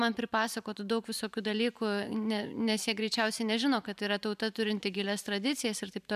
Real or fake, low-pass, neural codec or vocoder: fake; 14.4 kHz; vocoder, 44.1 kHz, 128 mel bands every 512 samples, BigVGAN v2